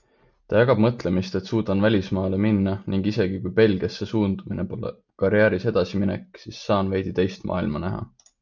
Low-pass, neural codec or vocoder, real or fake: 7.2 kHz; none; real